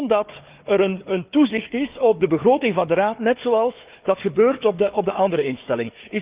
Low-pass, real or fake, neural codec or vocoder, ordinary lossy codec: 3.6 kHz; fake; codec, 16 kHz, 4 kbps, FunCodec, trained on Chinese and English, 50 frames a second; Opus, 32 kbps